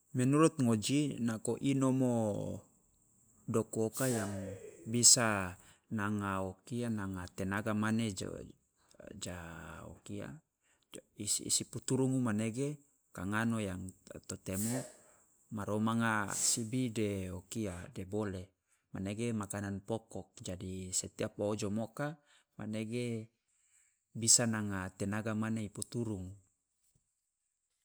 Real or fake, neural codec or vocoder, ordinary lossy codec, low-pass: real; none; none; none